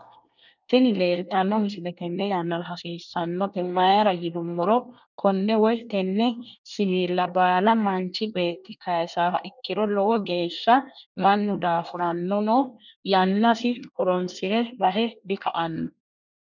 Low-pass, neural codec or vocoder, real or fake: 7.2 kHz; codec, 24 kHz, 1 kbps, SNAC; fake